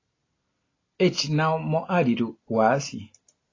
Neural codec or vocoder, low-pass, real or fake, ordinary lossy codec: none; 7.2 kHz; real; AAC, 32 kbps